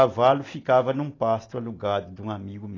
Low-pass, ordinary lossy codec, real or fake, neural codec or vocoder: 7.2 kHz; AAC, 32 kbps; real; none